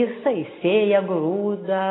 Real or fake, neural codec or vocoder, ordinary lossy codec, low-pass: real; none; AAC, 16 kbps; 7.2 kHz